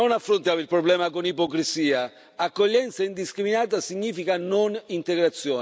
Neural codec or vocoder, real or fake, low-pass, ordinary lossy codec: none; real; none; none